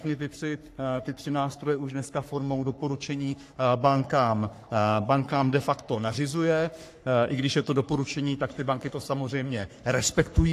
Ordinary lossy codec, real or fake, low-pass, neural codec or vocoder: AAC, 64 kbps; fake; 14.4 kHz; codec, 44.1 kHz, 3.4 kbps, Pupu-Codec